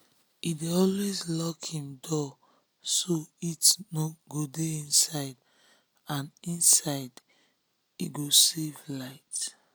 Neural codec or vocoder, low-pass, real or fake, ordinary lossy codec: none; none; real; none